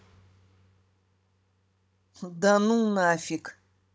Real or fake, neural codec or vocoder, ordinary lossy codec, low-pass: fake; codec, 16 kHz, 16 kbps, FunCodec, trained on Chinese and English, 50 frames a second; none; none